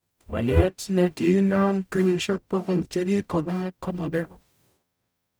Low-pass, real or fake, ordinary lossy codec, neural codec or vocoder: none; fake; none; codec, 44.1 kHz, 0.9 kbps, DAC